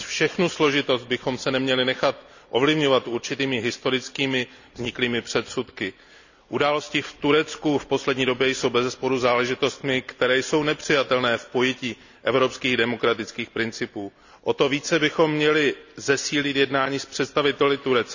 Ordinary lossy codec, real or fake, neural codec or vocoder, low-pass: none; real; none; 7.2 kHz